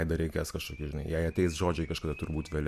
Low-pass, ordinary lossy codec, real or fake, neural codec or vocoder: 14.4 kHz; AAC, 96 kbps; real; none